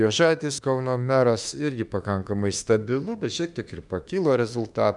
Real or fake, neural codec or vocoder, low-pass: fake; autoencoder, 48 kHz, 32 numbers a frame, DAC-VAE, trained on Japanese speech; 10.8 kHz